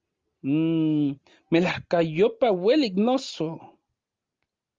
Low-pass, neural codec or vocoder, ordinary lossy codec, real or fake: 7.2 kHz; none; Opus, 32 kbps; real